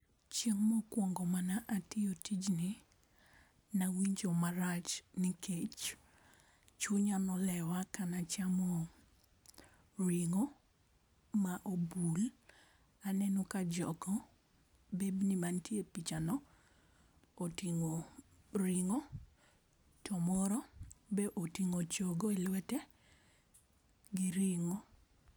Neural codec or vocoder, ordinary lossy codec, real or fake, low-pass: none; none; real; none